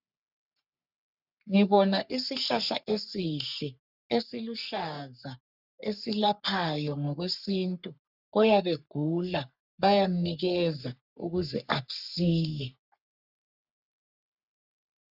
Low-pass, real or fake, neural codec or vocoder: 5.4 kHz; fake; codec, 44.1 kHz, 3.4 kbps, Pupu-Codec